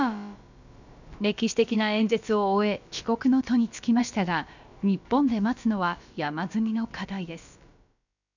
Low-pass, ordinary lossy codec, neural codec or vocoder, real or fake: 7.2 kHz; none; codec, 16 kHz, about 1 kbps, DyCAST, with the encoder's durations; fake